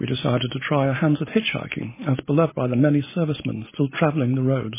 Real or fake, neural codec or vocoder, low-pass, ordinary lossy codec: real; none; 3.6 kHz; MP3, 16 kbps